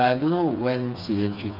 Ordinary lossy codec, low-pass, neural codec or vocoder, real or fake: MP3, 48 kbps; 5.4 kHz; codec, 16 kHz, 4 kbps, FreqCodec, smaller model; fake